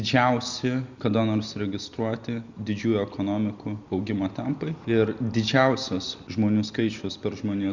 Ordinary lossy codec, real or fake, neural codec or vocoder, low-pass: Opus, 64 kbps; real; none; 7.2 kHz